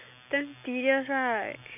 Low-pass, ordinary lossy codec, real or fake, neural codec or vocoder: 3.6 kHz; none; real; none